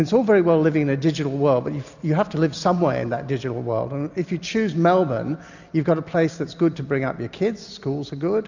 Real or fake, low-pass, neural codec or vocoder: real; 7.2 kHz; none